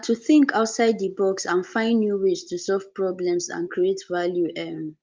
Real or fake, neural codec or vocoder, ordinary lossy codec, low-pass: real; none; Opus, 24 kbps; 7.2 kHz